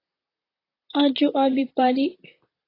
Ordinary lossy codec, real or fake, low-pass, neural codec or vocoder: AAC, 24 kbps; fake; 5.4 kHz; vocoder, 44.1 kHz, 128 mel bands, Pupu-Vocoder